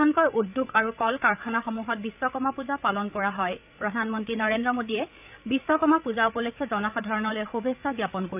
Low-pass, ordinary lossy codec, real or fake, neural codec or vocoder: 3.6 kHz; none; fake; codec, 16 kHz, 8 kbps, FreqCodec, larger model